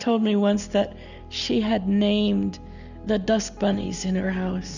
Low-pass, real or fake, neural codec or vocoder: 7.2 kHz; real; none